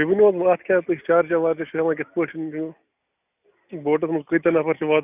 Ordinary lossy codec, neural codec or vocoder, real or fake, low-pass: none; none; real; 3.6 kHz